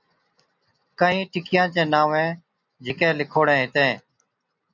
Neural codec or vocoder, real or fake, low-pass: none; real; 7.2 kHz